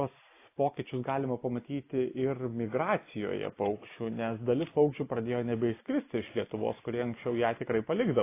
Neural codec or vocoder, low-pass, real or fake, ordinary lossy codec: none; 3.6 kHz; real; AAC, 24 kbps